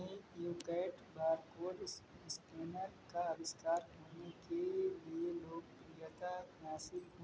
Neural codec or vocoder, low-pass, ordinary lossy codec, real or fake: none; none; none; real